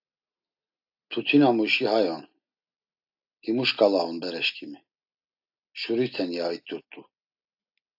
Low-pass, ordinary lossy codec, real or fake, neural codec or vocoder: 5.4 kHz; AAC, 48 kbps; real; none